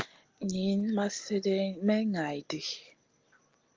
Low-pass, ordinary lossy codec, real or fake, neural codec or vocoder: 7.2 kHz; Opus, 32 kbps; real; none